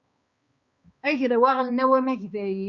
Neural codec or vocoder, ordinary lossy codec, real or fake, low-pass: codec, 16 kHz, 2 kbps, X-Codec, HuBERT features, trained on balanced general audio; Opus, 64 kbps; fake; 7.2 kHz